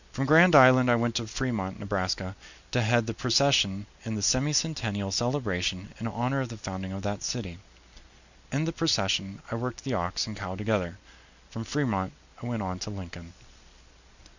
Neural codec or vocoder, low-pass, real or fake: none; 7.2 kHz; real